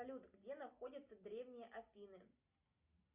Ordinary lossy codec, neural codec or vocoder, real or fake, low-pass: Opus, 64 kbps; none; real; 3.6 kHz